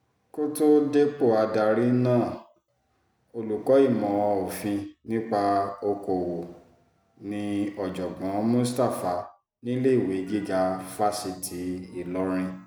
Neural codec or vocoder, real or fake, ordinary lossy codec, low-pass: none; real; none; none